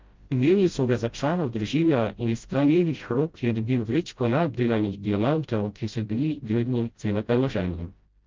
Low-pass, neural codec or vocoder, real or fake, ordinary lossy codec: 7.2 kHz; codec, 16 kHz, 0.5 kbps, FreqCodec, smaller model; fake; Opus, 32 kbps